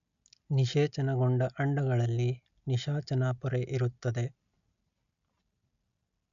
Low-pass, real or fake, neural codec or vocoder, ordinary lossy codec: 7.2 kHz; real; none; none